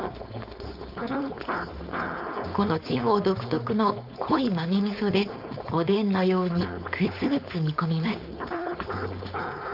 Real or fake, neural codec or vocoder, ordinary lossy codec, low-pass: fake; codec, 16 kHz, 4.8 kbps, FACodec; none; 5.4 kHz